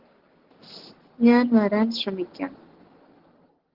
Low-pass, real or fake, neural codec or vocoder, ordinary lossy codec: 5.4 kHz; real; none; Opus, 16 kbps